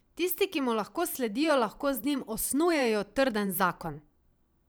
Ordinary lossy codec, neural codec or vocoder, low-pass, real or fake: none; vocoder, 44.1 kHz, 128 mel bands every 512 samples, BigVGAN v2; none; fake